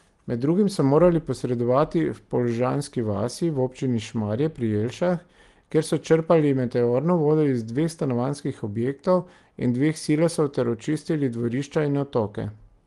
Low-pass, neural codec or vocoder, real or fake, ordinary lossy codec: 10.8 kHz; none; real; Opus, 24 kbps